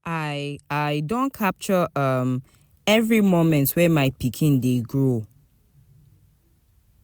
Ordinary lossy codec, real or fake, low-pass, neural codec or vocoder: none; real; none; none